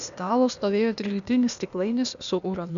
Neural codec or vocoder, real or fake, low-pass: codec, 16 kHz, 0.8 kbps, ZipCodec; fake; 7.2 kHz